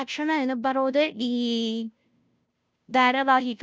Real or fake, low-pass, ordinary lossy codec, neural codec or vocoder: fake; none; none; codec, 16 kHz, 0.5 kbps, FunCodec, trained on Chinese and English, 25 frames a second